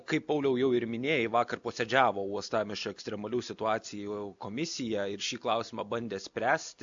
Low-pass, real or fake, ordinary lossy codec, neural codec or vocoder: 7.2 kHz; real; AAC, 64 kbps; none